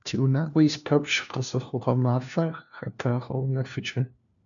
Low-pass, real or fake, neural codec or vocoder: 7.2 kHz; fake; codec, 16 kHz, 1 kbps, FunCodec, trained on LibriTTS, 50 frames a second